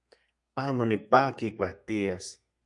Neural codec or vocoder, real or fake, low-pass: codec, 32 kHz, 1.9 kbps, SNAC; fake; 10.8 kHz